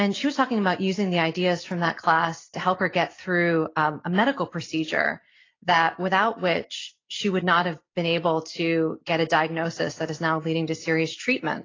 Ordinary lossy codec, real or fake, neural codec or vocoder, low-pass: AAC, 32 kbps; real; none; 7.2 kHz